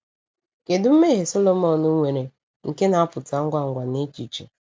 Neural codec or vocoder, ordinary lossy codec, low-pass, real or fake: none; none; none; real